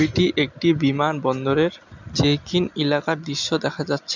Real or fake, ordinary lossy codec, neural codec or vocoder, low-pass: real; none; none; 7.2 kHz